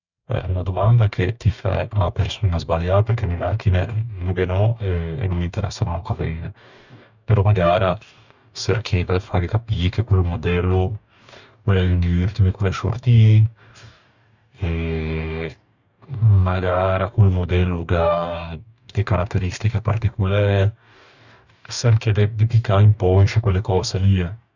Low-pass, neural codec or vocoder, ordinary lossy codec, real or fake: 7.2 kHz; codec, 44.1 kHz, 2.6 kbps, DAC; none; fake